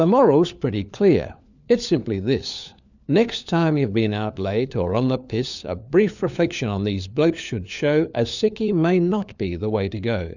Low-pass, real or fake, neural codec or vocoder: 7.2 kHz; fake; codec, 16 kHz, 4 kbps, FunCodec, trained on LibriTTS, 50 frames a second